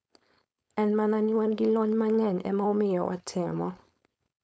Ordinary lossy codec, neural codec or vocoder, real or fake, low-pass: none; codec, 16 kHz, 4.8 kbps, FACodec; fake; none